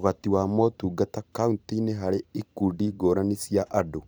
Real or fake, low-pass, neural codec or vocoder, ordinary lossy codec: fake; none; vocoder, 44.1 kHz, 128 mel bands every 256 samples, BigVGAN v2; none